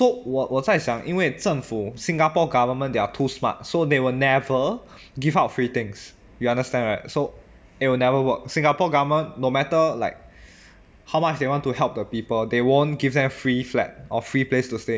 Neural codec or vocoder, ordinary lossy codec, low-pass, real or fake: none; none; none; real